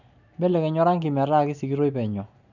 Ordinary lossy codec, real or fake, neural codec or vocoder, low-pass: none; real; none; 7.2 kHz